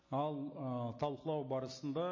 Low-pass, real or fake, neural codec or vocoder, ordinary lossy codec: 7.2 kHz; real; none; MP3, 32 kbps